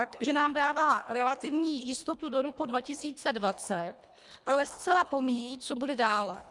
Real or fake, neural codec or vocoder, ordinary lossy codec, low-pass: fake; codec, 24 kHz, 1.5 kbps, HILCodec; MP3, 96 kbps; 10.8 kHz